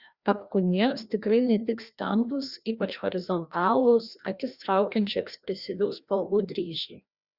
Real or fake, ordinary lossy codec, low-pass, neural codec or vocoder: fake; Opus, 64 kbps; 5.4 kHz; codec, 16 kHz, 1 kbps, FreqCodec, larger model